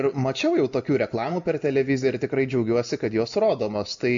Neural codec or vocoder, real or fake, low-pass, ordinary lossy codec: none; real; 7.2 kHz; MP3, 64 kbps